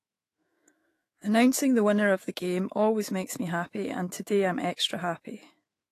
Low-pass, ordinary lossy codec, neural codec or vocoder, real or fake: 14.4 kHz; AAC, 48 kbps; autoencoder, 48 kHz, 128 numbers a frame, DAC-VAE, trained on Japanese speech; fake